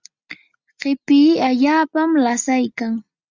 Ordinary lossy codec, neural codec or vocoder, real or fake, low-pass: Opus, 64 kbps; none; real; 7.2 kHz